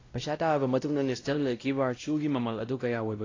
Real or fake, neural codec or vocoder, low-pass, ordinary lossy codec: fake; codec, 16 kHz, 0.5 kbps, X-Codec, WavLM features, trained on Multilingual LibriSpeech; 7.2 kHz; AAC, 32 kbps